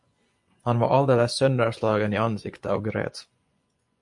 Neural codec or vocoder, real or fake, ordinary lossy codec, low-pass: vocoder, 24 kHz, 100 mel bands, Vocos; fake; MP3, 64 kbps; 10.8 kHz